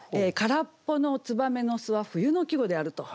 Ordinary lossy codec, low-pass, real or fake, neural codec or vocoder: none; none; real; none